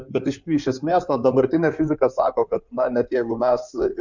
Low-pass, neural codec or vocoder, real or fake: 7.2 kHz; codec, 16 kHz in and 24 kHz out, 2.2 kbps, FireRedTTS-2 codec; fake